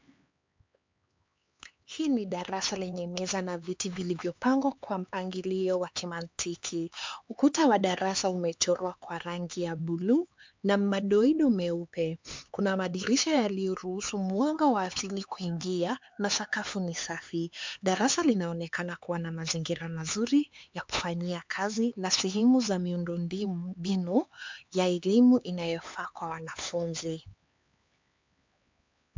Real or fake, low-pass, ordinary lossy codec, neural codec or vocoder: fake; 7.2 kHz; MP3, 64 kbps; codec, 16 kHz, 4 kbps, X-Codec, HuBERT features, trained on LibriSpeech